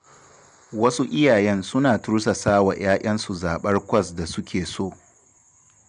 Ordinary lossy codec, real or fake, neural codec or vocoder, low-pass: MP3, 96 kbps; real; none; 9.9 kHz